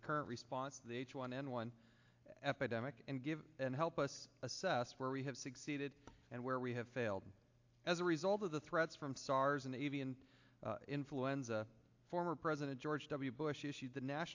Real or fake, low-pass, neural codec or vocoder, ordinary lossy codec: real; 7.2 kHz; none; AAC, 48 kbps